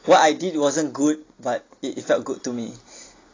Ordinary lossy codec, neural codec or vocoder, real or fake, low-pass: AAC, 32 kbps; none; real; 7.2 kHz